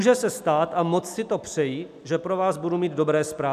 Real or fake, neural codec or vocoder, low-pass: real; none; 14.4 kHz